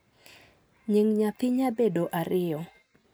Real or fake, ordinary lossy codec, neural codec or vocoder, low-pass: real; none; none; none